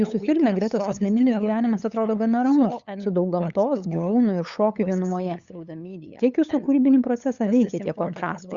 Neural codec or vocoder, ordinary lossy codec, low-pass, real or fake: codec, 16 kHz, 8 kbps, FunCodec, trained on LibriTTS, 25 frames a second; Opus, 64 kbps; 7.2 kHz; fake